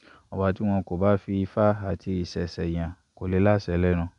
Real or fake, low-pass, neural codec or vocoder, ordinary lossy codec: real; 10.8 kHz; none; none